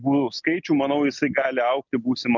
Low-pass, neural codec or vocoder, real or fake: 7.2 kHz; none; real